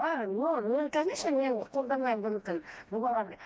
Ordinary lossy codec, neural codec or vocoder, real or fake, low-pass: none; codec, 16 kHz, 1 kbps, FreqCodec, smaller model; fake; none